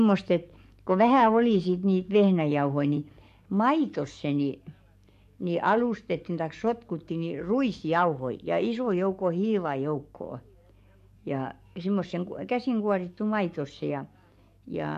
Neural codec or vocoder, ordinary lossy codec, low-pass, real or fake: codec, 44.1 kHz, 7.8 kbps, DAC; MP3, 64 kbps; 14.4 kHz; fake